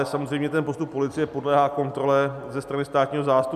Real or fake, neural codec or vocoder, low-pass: real; none; 14.4 kHz